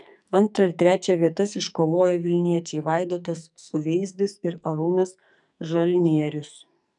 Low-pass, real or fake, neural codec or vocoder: 10.8 kHz; fake; codec, 44.1 kHz, 2.6 kbps, SNAC